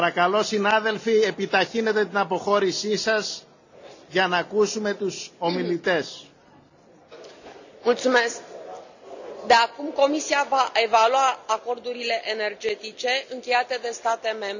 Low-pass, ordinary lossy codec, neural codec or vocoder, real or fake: 7.2 kHz; none; none; real